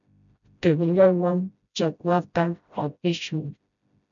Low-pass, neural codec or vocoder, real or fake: 7.2 kHz; codec, 16 kHz, 0.5 kbps, FreqCodec, smaller model; fake